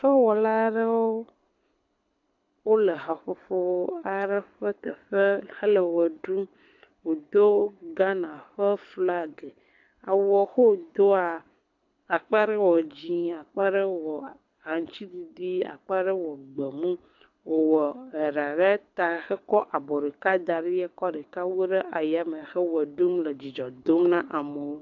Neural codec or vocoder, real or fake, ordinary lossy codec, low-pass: codec, 24 kHz, 6 kbps, HILCodec; fake; AAC, 48 kbps; 7.2 kHz